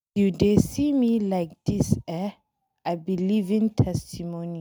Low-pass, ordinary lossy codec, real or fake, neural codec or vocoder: none; none; real; none